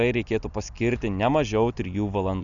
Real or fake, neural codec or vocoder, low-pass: real; none; 7.2 kHz